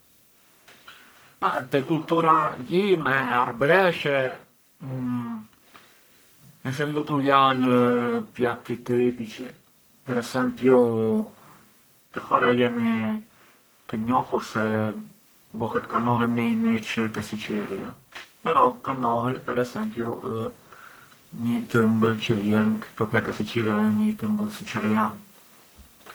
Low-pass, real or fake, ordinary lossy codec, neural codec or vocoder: none; fake; none; codec, 44.1 kHz, 1.7 kbps, Pupu-Codec